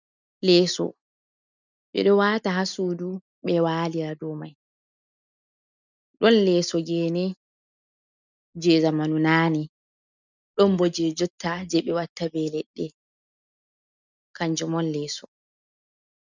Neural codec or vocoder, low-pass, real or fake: none; 7.2 kHz; real